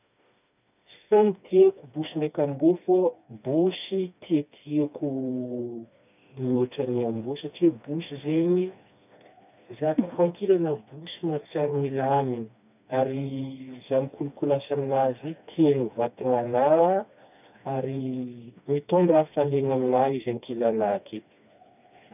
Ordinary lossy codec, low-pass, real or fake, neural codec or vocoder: none; 3.6 kHz; fake; codec, 16 kHz, 2 kbps, FreqCodec, smaller model